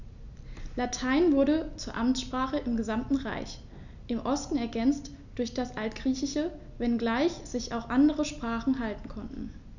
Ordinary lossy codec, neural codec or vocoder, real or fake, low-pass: none; none; real; 7.2 kHz